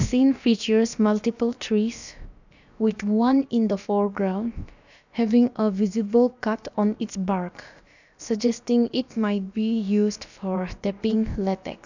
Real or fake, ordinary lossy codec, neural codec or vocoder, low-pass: fake; none; codec, 16 kHz, about 1 kbps, DyCAST, with the encoder's durations; 7.2 kHz